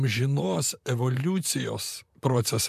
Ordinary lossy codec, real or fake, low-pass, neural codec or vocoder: MP3, 96 kbps; fake; 14.4 kHz; vocoder, 44.1 kHz, 128 mel bands, Pupu-Vocoder